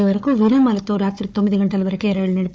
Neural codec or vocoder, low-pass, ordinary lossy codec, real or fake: codec, 16 kHz, 4 kbps, FunCodec, trained on Chinese and English, 50 frames a second; none; none; fake